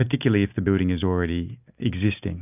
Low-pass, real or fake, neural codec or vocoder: 3.6 kHz; real; none